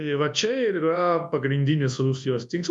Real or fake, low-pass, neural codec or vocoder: fake; 10.8 kHz; codec, 24 kHz, 0.9 kbps, WavTokenizer, large speech release